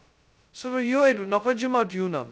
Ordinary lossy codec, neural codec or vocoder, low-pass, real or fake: none; codec, 16 kHz, 0.2 kbps, FocalCodec; none; fake